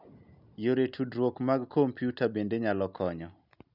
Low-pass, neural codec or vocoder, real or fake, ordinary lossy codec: 5.4 kHz; none; real; none